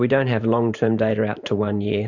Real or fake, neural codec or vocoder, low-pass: fake; vocoder, 44.1 kHz, 128 mel bands every 512 samples, BigVGAN v2; 7.2 kHz